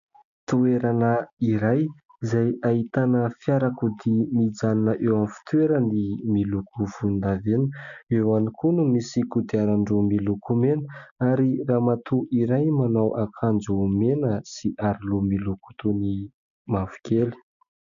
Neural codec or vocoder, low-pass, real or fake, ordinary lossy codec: none; 7.2 kHz; real; MP3, 96 kbps